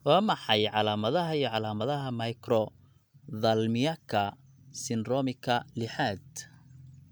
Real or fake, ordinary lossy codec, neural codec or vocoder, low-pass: real; none; none; none